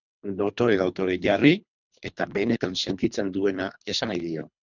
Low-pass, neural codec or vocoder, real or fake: 7.2 kHz; codec, 24 kHz, 3 kbps, HILCodec; fake